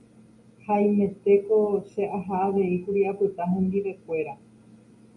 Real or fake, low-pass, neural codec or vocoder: real; 10.8 kHz; none